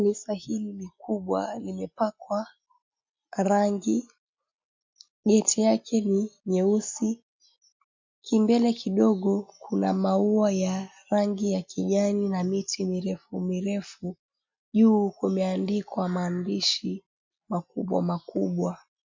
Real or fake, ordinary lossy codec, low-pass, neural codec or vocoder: real; MP3, 48 kbps; 7.2 kHz; none